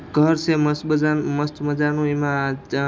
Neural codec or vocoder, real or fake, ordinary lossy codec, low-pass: none; real; none; none